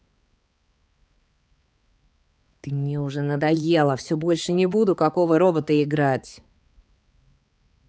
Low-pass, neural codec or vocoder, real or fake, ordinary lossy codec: none; codec, 16 kHz, 4 kbps, X-Codec, HuBERT features, trained on balanced general audio; fake; none